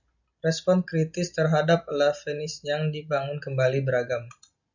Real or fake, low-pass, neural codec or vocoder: real; 7.2 kHz; none